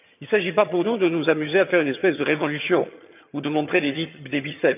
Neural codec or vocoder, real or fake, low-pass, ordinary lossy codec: vocoder, 22.05 kHz, 80 mel bands, HiFi-GAN; fake; 3.6 kHz; none